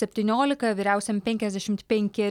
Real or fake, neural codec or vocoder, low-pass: real; none; 19.8 kHz